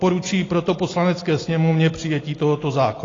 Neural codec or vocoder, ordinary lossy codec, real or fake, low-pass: none; AAC, 32 kbps; real; 7.2 kHz